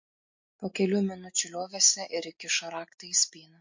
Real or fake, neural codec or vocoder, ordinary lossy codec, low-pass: real; none; MP3, 48 kbps; 7.2 kHz